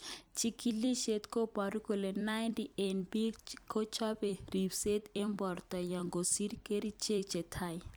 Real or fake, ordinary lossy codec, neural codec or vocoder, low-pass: fake; none; vocoder, 44.1 kHz, 128 mel bands every 256 samples, BigVGAN v2; none